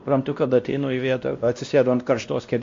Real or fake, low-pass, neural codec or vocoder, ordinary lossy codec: fake; 7.2 kHz; codec, 16 kHz, 1 kbps, X-Codec, WavLM features, trained on Multilingual LibriSpeech; MP3, 48 kbps